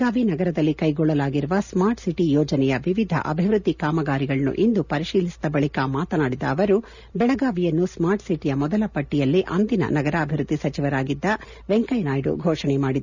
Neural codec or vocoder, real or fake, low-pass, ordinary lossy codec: none; real; 7.2 kHz; none